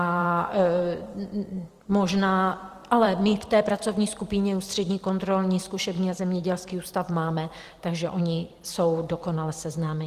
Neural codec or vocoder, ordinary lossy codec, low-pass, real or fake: vocoder, 44.1 kHz, 128 mel bands every 256 samples, BigVGAN v2; Opus, 24 kbps; 14.4 kHz; fake